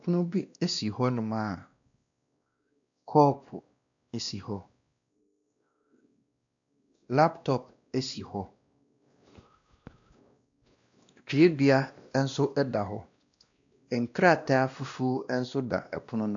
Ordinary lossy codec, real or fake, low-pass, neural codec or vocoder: AAC, 64 kbps; fake; 7.2 kHz; codec, 16 kHz, 1 kbps, X-Codec, WavLM features, trained on Multilingual LibriSpeech